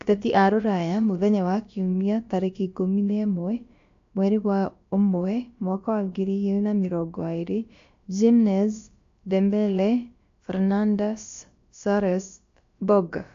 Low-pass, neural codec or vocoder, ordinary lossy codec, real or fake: 7.2 kHz; codec, 16 kHz, about 1 kbps, DyCAST, with the encoder's durations; AAC, 48 kbps; fake